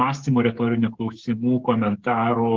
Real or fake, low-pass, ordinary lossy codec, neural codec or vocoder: fake; 7.2 kHz; Opus, 16 kbps; codec, 16 kHz, 16 kbps, FreqCodec, smaller model